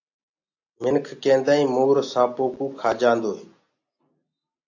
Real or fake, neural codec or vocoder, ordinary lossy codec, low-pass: real; none; AAC, 48 kbps; 7.2 kHz